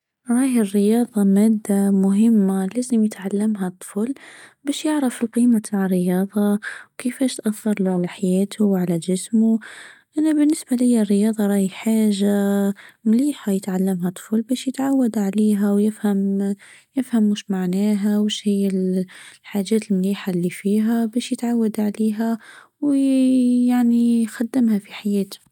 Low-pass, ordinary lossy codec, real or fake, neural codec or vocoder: 19.8 kHz; none; real; none